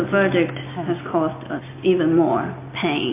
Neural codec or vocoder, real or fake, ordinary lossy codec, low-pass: none; real; MP3, 24 kbps; 3.6 kHz